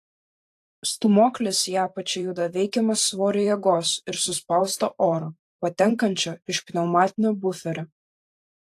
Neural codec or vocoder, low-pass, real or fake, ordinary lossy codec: vocoder, 44.1 kHz, 128 mel bands every 512 samples, BigVGAN v2; 14.4 kHz; fake; AAC, 64 kbps